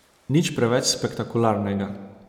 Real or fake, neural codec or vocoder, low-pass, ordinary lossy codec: real; none; 19.8 kHz; none